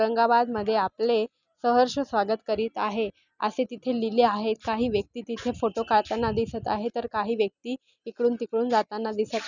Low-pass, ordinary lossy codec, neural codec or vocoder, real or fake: 7.2 kHz; none; none; real